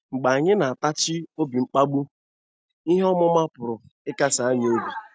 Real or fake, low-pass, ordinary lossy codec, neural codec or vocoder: real; none; none; none